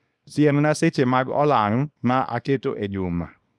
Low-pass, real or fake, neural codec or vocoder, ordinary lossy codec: none; fake; codec, 24 kHz, 0.9 kbps, WavTokenizer, small release; none